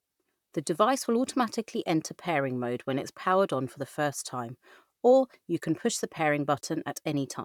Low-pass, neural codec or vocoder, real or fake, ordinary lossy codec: 19.8 kHz; vocoder, 44.1 kHz, 128 mel bands, Pupu-Vocoder; fake; none